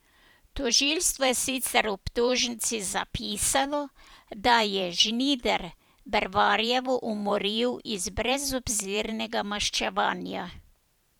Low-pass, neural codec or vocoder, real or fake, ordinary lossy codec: none; vocoder, 44.1 kHz, 128 mel bands every 512 samples, BigVGAN v2; fake; none